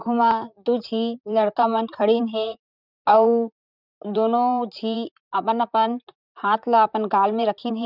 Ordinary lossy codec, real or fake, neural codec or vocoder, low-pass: none; fake; vocoder, 44.1 kHz, 128 mel bands, Pupu-Vocoder; 5.4 kHz